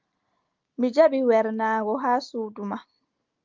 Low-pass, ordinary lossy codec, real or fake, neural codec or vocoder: 7.2 kHz; Opus, 32 kbps; real; none